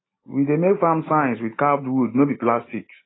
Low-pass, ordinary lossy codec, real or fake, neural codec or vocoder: 7.2 kHz; AAC, 16 kbps; real; none